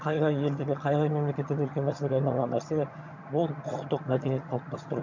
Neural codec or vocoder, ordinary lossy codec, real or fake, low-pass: vocoder, 22.05 kHz, 80 mel bands, HiFi-GAN; MP3, 48 kbps; fake; 7.2 kHz